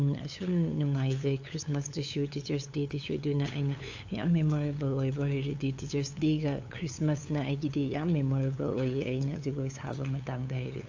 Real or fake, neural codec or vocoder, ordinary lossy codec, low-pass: fake; codec, 16 kHz, 8 kbps, FunCodec, trained on LibriTTS, 25 frames a second; AAC, 48 kbps; 7.2 kHz